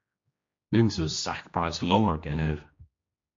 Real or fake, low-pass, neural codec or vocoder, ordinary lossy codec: fake; 7.2 kHz; codec, 16 kHz, 1 kbps, X-Codec, HuBERT features, trained on general audio; MP3, 48 kbps